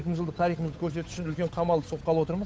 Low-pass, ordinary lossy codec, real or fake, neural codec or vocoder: none; none; fake; codec, 16 kHz, 8 kbps, FunCodec, trained on Chinese and English, 25 frames a second